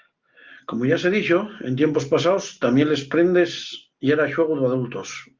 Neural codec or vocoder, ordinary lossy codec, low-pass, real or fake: none; Opus, 32 kbps; 7.2 kHz; real